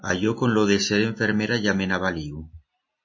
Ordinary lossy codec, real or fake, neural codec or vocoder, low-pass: MP3, 32 kbps; real; none; 7.2 kHz